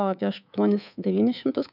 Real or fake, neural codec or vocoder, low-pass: fake; codec, 24 kHz, 3.1 kbps, DualCodec; 5.4 kHz